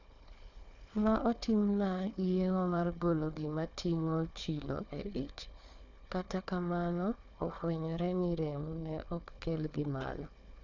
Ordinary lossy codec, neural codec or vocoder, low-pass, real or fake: none; codec, 16 kHz, 4 kbps, FunCodec, trained on Chinese and English, 50 frames a second; 7.2 kHz; fake